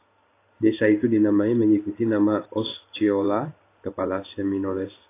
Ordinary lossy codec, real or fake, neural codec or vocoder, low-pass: AAC, 24 kbps; fake; codec, 16 kHz in and 24 kHz out, 1 kbps, XY-Tokenizer; 3.6 kHz